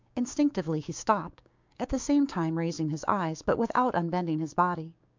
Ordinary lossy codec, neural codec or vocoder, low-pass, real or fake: MP3, 64 kbps; codec, 16 kHz, 6 kbps, DAC; 7.2 kHz; fake